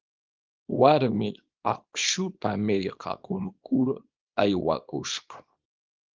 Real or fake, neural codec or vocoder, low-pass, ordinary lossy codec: fake; codec, 24 kHz, 0.9 kbps, WavTokenizer, small release; 7.2 kHz; Opus, 24 kbps